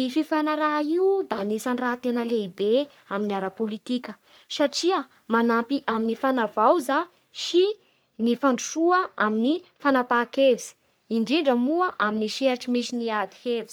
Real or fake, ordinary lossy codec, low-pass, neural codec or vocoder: fake; none; none; codec, 44.1 kHz, 3.4 kbps, Pupu-Codec